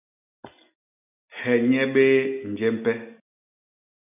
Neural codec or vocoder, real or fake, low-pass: none; real; 3.6 kHz